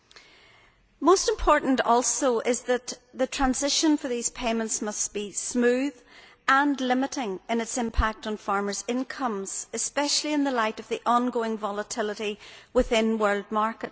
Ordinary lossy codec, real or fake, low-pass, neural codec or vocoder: none; real; none; none